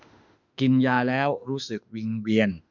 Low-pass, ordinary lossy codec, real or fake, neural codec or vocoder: 7.2 kHz; none; fake; autoencoder, 48 kHz, 32 numbers a frame, DAC-VAE, trained on Japanese speech